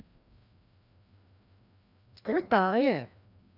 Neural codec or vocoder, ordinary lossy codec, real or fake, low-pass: codec, 16 kHz, 1 kbps, FreqCodec, larger model; none; fake; 5.4 kHz